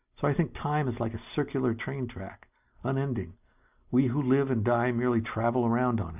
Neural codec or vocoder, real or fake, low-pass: none; real; 3.6 kHz